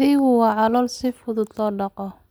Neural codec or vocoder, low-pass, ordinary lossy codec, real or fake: none; none; none; real